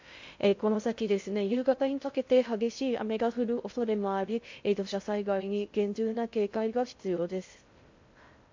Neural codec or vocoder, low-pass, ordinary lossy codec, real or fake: codec, 16 kHz in and 24 kHz out, 0.6 kbps, FocalCodec, streaming, 2048 codes; 7.2 kHz; MP3, 48 kbps; fake